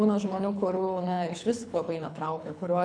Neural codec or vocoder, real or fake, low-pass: codec, 24 kHz, 3 kbps, HILCodec; fake; 9.9 kHz